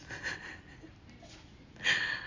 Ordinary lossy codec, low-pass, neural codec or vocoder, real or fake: AAC, 48 kbps; 7.2 kHz; none; real